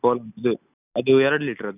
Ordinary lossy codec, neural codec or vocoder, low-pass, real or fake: none; none; 3.6 kHz; real